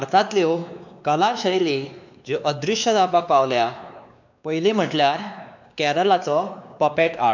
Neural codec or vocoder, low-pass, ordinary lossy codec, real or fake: codec, 16 kHz, 2 kbps, X-Codec, WavLM features, trained on Multilingual LibriSpeech; 7.2 kHz; none; fake